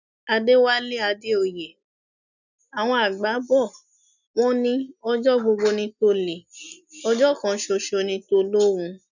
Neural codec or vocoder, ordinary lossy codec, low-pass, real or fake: none; none; 7.2 kHz; real